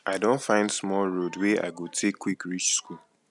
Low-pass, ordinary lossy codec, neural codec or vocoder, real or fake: 10.8 kHz; none; none; real